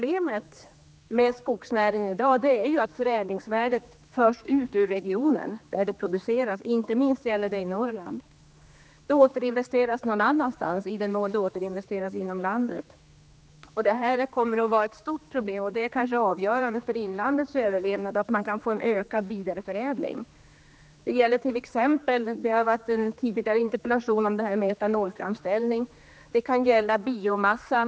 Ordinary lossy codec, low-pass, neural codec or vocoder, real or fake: none; none; codec, 16 kHz, 2 kbps, X-Codec, HuBERT features, trained on general audio; fake